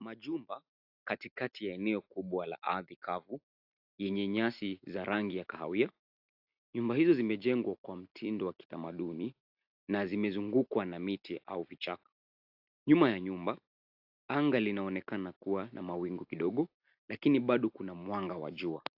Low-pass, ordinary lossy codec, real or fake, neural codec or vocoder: 5.4 kHz; AAC, 48 kbps; real; none